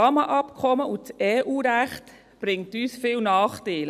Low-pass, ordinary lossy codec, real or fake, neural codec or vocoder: 14.4 kHz; none; real; none